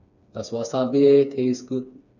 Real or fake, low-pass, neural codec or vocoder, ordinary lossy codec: fake; 7.2 kHz; codec, 16 kHz, 4 kbps, FreqCodec, smaller model; none